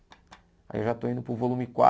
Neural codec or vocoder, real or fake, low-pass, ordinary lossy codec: none; real; none; none